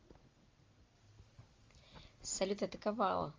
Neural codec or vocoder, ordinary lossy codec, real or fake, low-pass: none; Opus, 32 kbps; real; 7.2 kHz